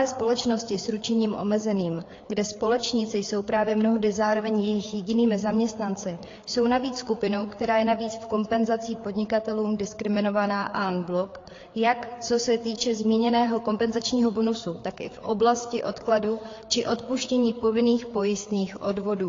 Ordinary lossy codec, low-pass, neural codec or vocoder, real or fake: AAC, 32 kbps; 7.2 kHz; codec, 16 kHz, 4 kbps, FreqCodec, larger model; fake